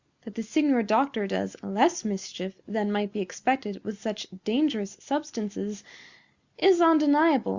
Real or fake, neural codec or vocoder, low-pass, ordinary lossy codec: real; none; 7.2 kHz; Opus, 64 kbps